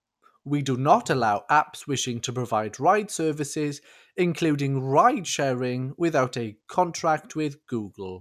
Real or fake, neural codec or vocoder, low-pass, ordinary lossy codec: real; none; 14.4 kHz; none